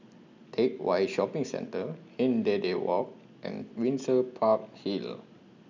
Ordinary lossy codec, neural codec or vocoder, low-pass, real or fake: MP3, 64 kbps; none; 7.2 kHz; real